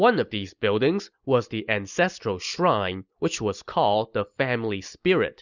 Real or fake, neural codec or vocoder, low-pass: real; none; 7.2 kHz